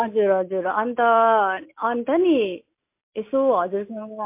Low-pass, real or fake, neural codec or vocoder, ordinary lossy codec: 3.6 kHz; real; none; MP3, 24 kbps